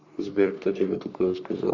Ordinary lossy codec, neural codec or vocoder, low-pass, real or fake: MP3, 64 kbps; codec, 44.1 kHz, 3.4 kbps, Pupu-Codec; 7.2 kHz; fake